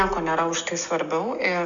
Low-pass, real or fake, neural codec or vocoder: 7.2 kHz; real; none